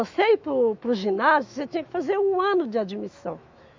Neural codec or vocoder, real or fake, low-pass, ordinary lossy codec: autoencoder, 48 kHz, 128 numbers a frame, DAC-VAE, trained on Japanese speech; fake; 7.2 kHz; MP3, 64 kbps